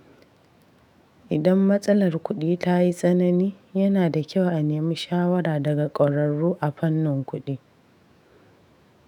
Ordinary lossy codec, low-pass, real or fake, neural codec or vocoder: none; 19.8 kHz; fake; autoencoder, 48 kHz, 128 numbers a frame, DAC-VAE, trained on Japanese speech